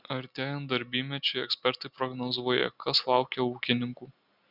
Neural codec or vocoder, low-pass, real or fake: none; 5.4 kHz; real